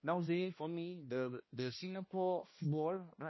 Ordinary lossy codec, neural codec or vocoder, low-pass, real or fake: MP3, 24 kbps; codec, 16 kHz, 1 kbps, X-Codec, HuBERT features, trained on balanced general audio; 7.2 kHz; fake